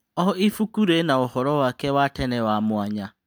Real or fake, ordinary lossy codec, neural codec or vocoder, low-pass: real; none; none; none